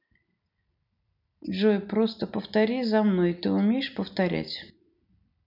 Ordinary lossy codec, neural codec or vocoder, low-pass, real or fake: none; none; 5.4 kHz; real